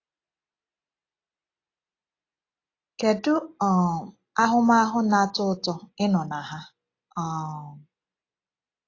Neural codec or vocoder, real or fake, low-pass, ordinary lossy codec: none; real; 7.2 kHz; AAC, 32 kbps